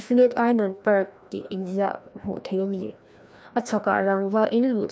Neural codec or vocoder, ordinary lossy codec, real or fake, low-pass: codec, 16 kHz, 1 kbps, FreqCodec, larger model; none; fake; none